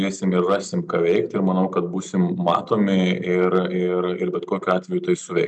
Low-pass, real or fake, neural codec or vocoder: 10.8 kHz; real; none